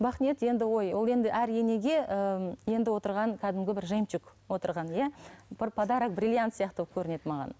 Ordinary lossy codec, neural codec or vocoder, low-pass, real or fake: none; none; none; real